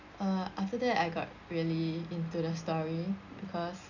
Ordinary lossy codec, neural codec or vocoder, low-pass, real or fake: none; none; 7.2 kHz; real